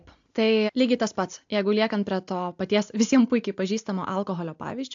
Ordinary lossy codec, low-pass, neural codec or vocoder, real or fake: AAC, 64 kbps; 7.2 kHz; none; real